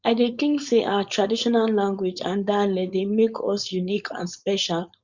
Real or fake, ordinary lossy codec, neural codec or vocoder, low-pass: fake; Opus, 64 kbps; codec, 16 kHz, 4.8 kbps, FACodec; 7.2 kHz